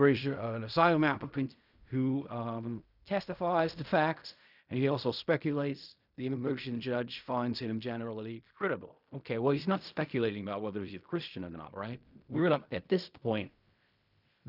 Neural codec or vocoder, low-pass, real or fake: codec, 16 kHz in and 24 kHz out, 0.4 kbps, LongCat-Audio-Codec, fine tuned four codebook decoder; 5.4 kHz; fake